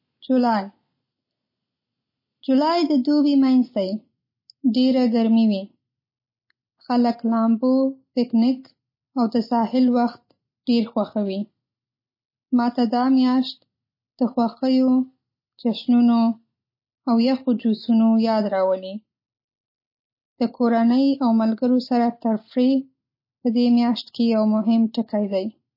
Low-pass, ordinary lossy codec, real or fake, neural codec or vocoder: 5.4 kHz; MP3, 24 kbps; real; none